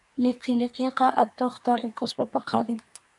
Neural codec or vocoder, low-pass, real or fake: codec, 24 kHz, 1 kbps, SNAC; 10.8 kHz; fake